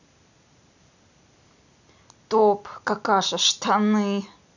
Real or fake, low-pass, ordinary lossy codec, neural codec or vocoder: real; 7.2 kHz; none; none